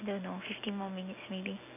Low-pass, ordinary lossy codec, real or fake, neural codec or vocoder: 3.6 kHz; none; real; none